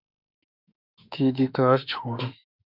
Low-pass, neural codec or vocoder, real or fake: 5.4 kHz; autoencoder, 48 kHz, 32 numbers a frame, DAC-VAE, trained on Japanese speech; fake